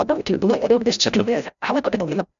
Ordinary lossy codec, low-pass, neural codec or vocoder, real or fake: MP3, 96 kbps; 7.2 kHz; codec, 16 kHz, 0.5 kbps, FreqCodec, larger model; fake